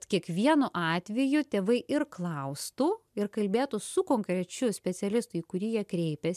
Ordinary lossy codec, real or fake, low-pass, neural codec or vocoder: MP3, 96 kbps; real; 14.4 kHz; none